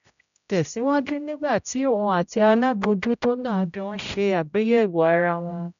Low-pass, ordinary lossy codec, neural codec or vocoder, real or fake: 7.2 kHz; none; codec, 16 kHz, 0.5 kbps, X-Codec, HuBERT features, trained on general audio; fake